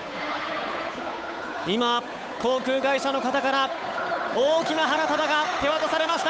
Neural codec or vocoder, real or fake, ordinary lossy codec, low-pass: codec, 16 kHz, 8 kbps, FunCodec, trained on Chinese and English, 25 frames a second; fake; none; none